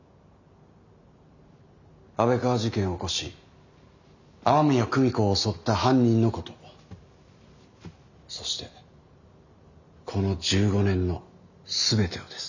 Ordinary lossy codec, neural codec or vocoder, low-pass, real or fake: none; none; 7.2 kHz; real